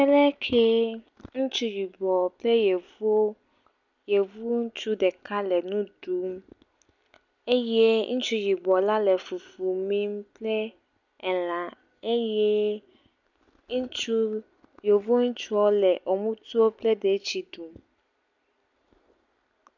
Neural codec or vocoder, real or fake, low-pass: none; real; 7.2 kHz